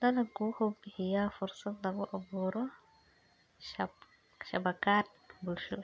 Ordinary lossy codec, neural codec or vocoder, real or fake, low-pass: none; none; real; none